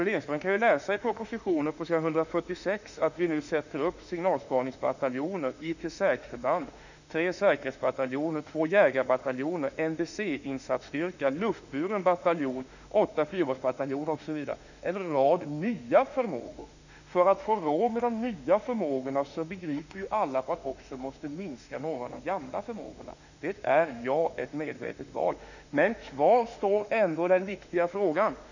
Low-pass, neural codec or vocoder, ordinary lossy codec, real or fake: 7.2 kHz; autoencoder, 48 kHz, 32 numbers a frame, DAC-VAE, trained on Japanese speech; none; fake